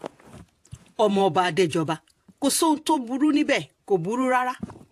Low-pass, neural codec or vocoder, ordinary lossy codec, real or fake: 14.4 kHz; vocoder, 48 kHz, 128 mel bands, Vocos; AAC, 64 kbps; fake